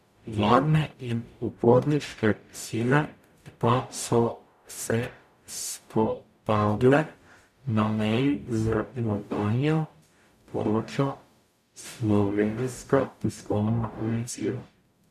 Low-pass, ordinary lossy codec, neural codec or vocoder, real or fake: 14.4 kHz; none; codec, 44.1 kHz, 0.9 kbps, DAC; fake